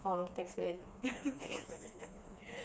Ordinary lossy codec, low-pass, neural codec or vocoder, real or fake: none; none; codec, 16 kHz, 2 kbps, FreqCodec, smaller model; fake